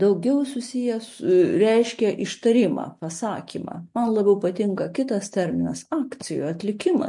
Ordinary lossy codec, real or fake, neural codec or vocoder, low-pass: MP3, 48 kbps; real; none; 10.8 kHz